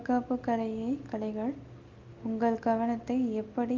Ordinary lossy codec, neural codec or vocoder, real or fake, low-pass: Opus, 24 kbps; none; real; 7.2 kHz